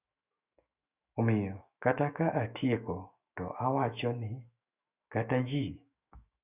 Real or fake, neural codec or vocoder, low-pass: fake; autoencoder, 48 kHz, 128 numbers a frame, DAC-VAE, trained on Japanese speech; 3.6 kHz